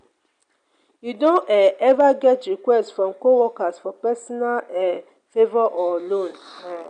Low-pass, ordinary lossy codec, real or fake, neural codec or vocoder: 9.9 kHz; none; real; none